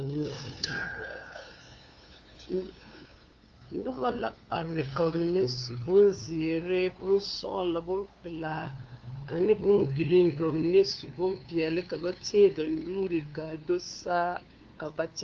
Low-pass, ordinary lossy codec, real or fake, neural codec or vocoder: 7.2 kHz; Opus, 32 kbps; fake; codec, 16 kHz, 2 kbps, FunCodec, trained on LibriTTS, 25 frames a second